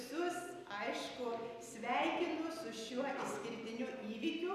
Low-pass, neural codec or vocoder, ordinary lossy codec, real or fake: 14.4 kHz; none; AAC, 96 kbps; real